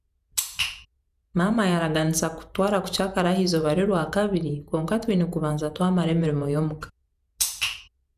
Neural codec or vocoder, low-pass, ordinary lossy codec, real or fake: vocoder, 48 kHz, 128 mel bands, Vocos; 14.4 kHz; none; fake